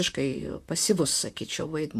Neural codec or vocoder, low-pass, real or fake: vocoder, 44.1 kHz, 128 mel bands, Pupu-Vocoder; 14.4 kHz; fake